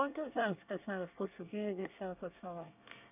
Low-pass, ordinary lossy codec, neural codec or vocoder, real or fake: 3.6 kHz; none; codec, 24 kHz, 1 kbps, SNAC; fake